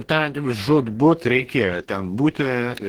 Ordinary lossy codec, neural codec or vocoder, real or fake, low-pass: Opus, 16 kbps; codec, 44.1 kHz, 2.6 kbps, DAC; fake; 19.8 kHz